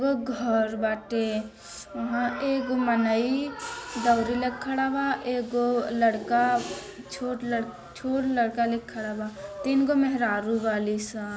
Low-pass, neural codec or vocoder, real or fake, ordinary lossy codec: none; none; real; none